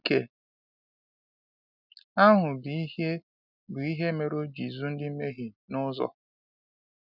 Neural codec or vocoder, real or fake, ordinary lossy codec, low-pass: none; real; none; 5.4 kHz